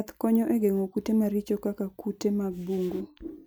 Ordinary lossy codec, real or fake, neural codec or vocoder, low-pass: none; real; none; none